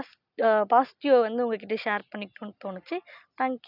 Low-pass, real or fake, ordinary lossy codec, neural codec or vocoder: 5.4 kHz; real; none; none